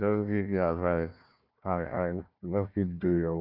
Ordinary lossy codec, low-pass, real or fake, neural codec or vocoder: none; 5.4 kHz; fake; codec, 16 kHz, 1 kbps, FunCodec, trained on Chinese and English, 50 frames a second